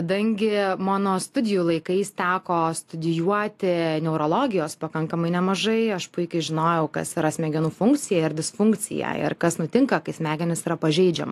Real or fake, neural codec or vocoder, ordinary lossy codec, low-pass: real; none; AAC, 64 kbps; 14.4 kHz